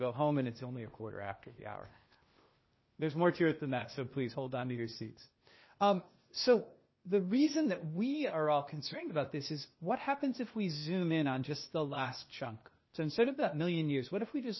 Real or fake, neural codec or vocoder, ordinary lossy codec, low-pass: fake; codec, 16 kHz, 0.8 kbps, ZipCodec; MP3, 24 kbps; 7.2 kHz